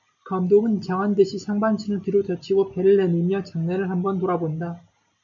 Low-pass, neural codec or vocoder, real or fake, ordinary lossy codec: 7.2 kHz; none; real; MP3, 64 kbps